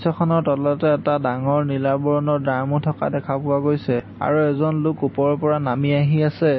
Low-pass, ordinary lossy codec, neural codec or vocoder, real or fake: 7.2 kHz; MP3, 24 kbps; none; real